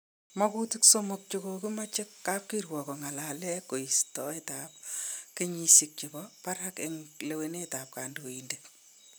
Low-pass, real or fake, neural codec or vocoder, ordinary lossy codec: none; real; none; none